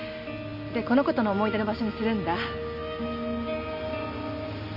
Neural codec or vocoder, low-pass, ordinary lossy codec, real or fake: none; 5.4 kHz; none; real